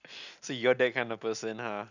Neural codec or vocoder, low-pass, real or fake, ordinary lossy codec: none; 7.2 kHz; real; none